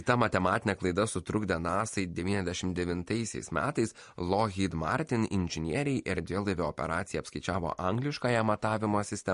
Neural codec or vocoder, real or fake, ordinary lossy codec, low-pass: none; real; MP3, 48 kbps; 10.8 kHz